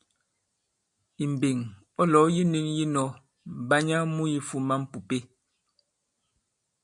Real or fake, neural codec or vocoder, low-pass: real; none; 10.8 kHz